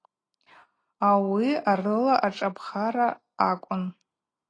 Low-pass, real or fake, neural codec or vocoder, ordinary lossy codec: 9.9 kHz; real; none; AAC, 32 kbps